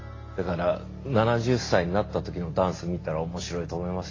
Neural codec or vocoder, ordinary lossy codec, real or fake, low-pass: none; AAC, 32 kbps; real; 7.2 kHz